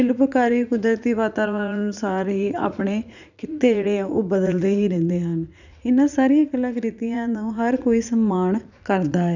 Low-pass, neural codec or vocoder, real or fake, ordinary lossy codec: 7.2 kHz; vocoder, 44.1 kHz, 80 mel bands, Vocos; fake; none